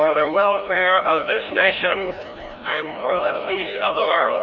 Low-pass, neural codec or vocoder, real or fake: 7.2 kHz; codec, 16 kHz, 1 kbps, FreqCodec, larger model; fake